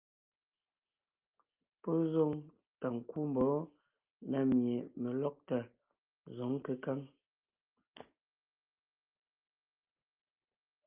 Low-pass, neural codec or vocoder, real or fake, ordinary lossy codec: 3.6 kHz; none; real; Opus, 24 kbps